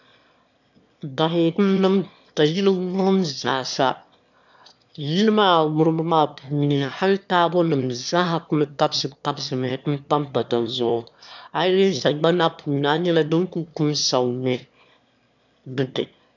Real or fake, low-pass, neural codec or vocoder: fake; 7.2 kHz; autoencoder, 22.05 kHz, a latent of 192 numbers a frame, VITS, trained on one speaker